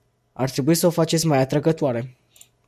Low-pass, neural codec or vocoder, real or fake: 14.4 kHz; none; real